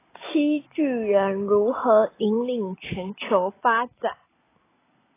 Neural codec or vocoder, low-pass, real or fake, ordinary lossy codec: none; 3.6 kHz; real; AAC, 16 kbps